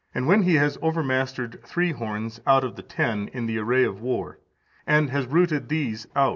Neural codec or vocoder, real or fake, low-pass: none; real; 7.2 kHz